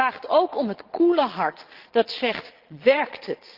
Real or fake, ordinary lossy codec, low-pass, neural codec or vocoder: fake; Opus, 16 kbps; 5.4 kHz; vocoder, 22.05 kHz, 80 mel bands, Vocos